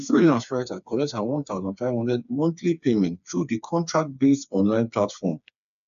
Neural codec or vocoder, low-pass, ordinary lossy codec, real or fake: codec, 16 kHz, 4 kbps, FreqCodec, smaller model; 7.2 kHz; none; fake